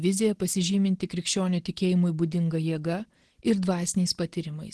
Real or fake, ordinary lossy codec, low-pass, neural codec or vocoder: real; Opus, 16 kbps; 10.8 kHz; none